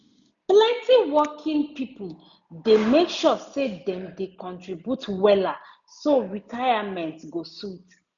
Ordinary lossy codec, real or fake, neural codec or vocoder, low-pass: none; real; none; 7.2 kHz